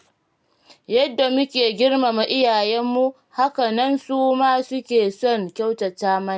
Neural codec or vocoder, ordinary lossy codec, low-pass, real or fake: none; none; none; real